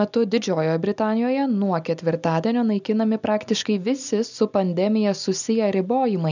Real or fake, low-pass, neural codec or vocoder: real; 7.2 kHz; none